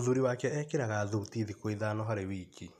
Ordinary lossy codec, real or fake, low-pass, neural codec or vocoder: none; real; 14.4 kHz; none